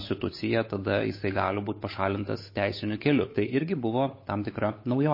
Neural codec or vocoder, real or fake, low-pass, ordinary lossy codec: codec, 16 kHz, 8 kbps, FunCodec, trained on Chinese and English, 25 frames a second; fake; 5.4 kHz; MP3, 24 kbps